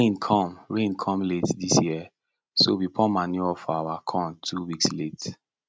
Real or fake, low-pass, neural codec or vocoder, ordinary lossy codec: real; none; none; none